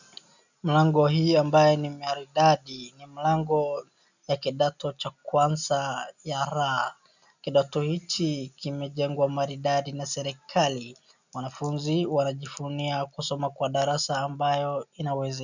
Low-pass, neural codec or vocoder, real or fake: 7.2 kHz; none; real